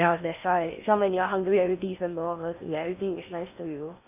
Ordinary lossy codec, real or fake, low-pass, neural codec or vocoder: none; fake; 3.6 kHz; codec, 16 kHz in and 24 kHz out, 0.6 kbps, FocalCodec, streaming, 4096 codes